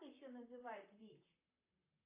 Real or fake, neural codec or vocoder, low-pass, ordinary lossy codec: fake; vocoder, 44.1 kHz, 128 mel bands, Pupu-Vocoder; 3.6 kHz; AAC, 24 kbps